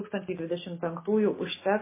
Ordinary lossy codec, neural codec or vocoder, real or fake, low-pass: MP3, 16 kbps; none; real; 3.6 kHz